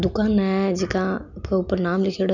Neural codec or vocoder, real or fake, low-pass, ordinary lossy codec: none; real; 7.2 kHz; none